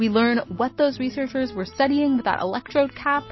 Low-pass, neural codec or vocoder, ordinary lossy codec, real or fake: 7.2 kHz; none; MP3, 24 kbps; real